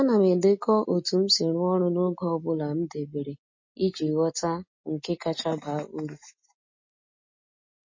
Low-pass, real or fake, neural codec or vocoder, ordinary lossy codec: 7.2 kHz; real; none; MP3, 32 kbps